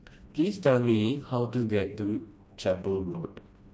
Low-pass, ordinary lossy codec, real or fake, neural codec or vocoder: none; none; fake; codec, 16 kHz, 1 kbps, FreqCodec, smaller model